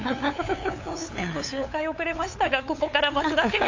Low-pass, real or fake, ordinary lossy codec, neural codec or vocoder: 7.2 kHz; fake; AAC, 48 kbps; codec, 16 kHz, 8 kbps, FunCodec, trained on LibriTTS, 25 frames a second